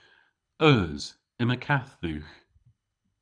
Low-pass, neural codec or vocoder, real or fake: 9.9 kHz; codec, 24 kHz, 6 kbps, HILCodec; fake